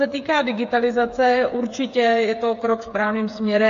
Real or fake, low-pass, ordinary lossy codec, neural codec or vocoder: fake; 7.2 kHz; AAC, 48 kbps; codec, 16 kHz, 8 kbps, FreqCodec, smaller model